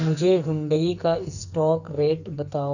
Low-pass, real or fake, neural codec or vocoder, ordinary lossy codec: 7.2 kHz; fake; codec, 44.1 kHz, 2.6 kbps, SNAC; none